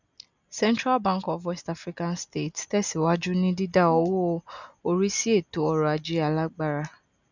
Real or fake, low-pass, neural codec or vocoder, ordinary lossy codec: real; 7.2 kHz; none; none